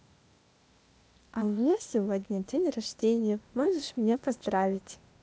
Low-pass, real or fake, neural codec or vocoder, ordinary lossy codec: none; fake; codec, 16 kHz, 0.8 kbps, ZipCodec; none